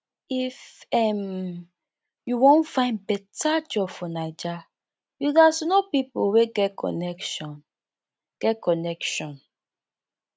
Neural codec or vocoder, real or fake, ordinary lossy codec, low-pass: none; real; none; none